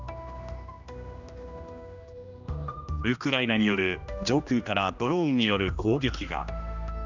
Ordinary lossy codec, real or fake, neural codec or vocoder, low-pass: none; fake; codec, 16 kHz, 1 kbps, X-Codec, HuBERT features, trained on general audio; 7.2 kHz